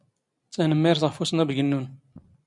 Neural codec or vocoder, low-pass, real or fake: none; 10.8 kHz; real